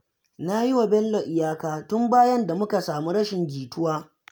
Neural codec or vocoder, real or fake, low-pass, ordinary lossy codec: none; real; none; none